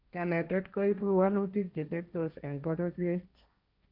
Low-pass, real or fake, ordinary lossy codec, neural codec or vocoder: 5.4 kHz; fake; none; codec, 16 kHz, 1.1 kbps, Voila-Tokenizer